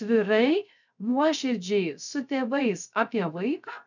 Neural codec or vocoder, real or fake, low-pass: codec, 16 kHz, 0.3 kbps, FocalCodec; fake; 7.2 kHz